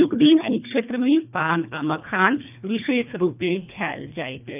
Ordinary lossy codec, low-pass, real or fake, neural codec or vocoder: none; 3.6 kHz; fake; codec, 24 kHz, 1.5 kbps, HILCodec